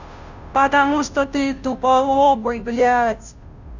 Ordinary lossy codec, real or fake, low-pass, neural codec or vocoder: none; fake; 7.2 kHz; codec, 16 kHz, 0.5 kbps, FunCodec, trained on Chinese and English, 25 frames a second